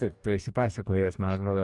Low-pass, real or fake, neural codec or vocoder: 10.8 kHz; fake; codec, 44.1 kHz, 2.6 kbps, DAC